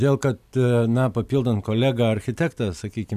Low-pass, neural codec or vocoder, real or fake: 14.4 kHz; none; real